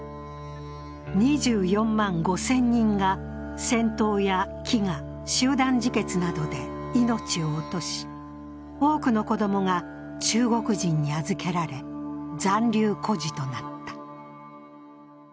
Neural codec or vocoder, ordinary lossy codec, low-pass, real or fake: none; none; none; real